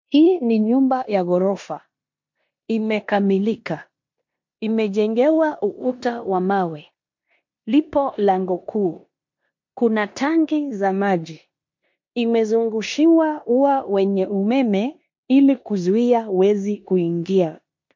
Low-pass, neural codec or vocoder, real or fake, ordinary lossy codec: 7.2 kHz; codec, 16 kHz in and 24 kHz out, 0.9 kbps, LongCat-Audio-Codec, four codebook decoder; fake; MP3, 48 kbps